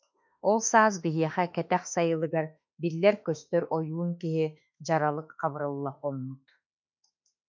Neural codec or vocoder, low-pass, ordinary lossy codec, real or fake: autoencoder, 48 kHz, 32 numbers a frame, DAC-VAE, trained on Japanese speech; 7.2 kHz; MP3, 64 kbps; fake